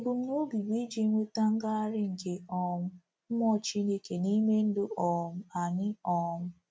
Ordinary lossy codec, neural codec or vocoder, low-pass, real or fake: none; none; none; real